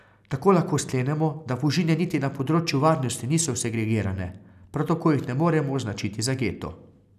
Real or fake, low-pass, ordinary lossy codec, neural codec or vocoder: real; 14.4 kHz; none; none